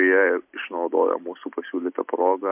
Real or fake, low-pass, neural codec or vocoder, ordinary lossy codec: real; 3.6 kHz; none; MP3, 32 kbps